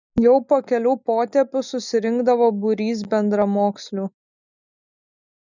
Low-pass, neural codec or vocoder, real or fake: 7.2 kHz; none; real